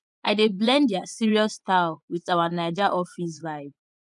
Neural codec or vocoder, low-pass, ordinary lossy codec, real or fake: vocoder, 24 kHz, 100 mel bands, Vocos; 10.8 kHz; none; fake